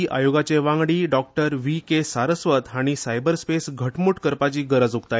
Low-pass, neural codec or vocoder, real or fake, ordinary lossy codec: none; none; real; none